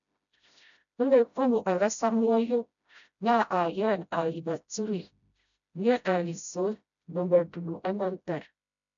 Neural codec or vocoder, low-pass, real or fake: codec, 16 kHz, 0.5 kbps, FreqCodec, smaller model; 7.2 kHz; fake